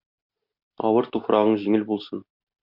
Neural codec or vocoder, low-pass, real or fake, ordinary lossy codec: none; 5.4 kHz; real; MP3, 48 kbps